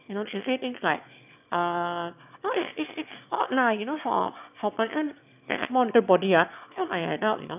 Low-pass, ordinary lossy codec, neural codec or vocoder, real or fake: 3.6 kHz; none; autoencoder, 22.05 kHz, a latent of 192 numbers a frame, VITS, trained on one speaker; fake